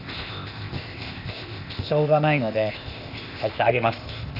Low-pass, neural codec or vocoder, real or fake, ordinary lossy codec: 5.4 kHz; codec, 16 kHz, 0.8 kbps, ZipCodec; fake; none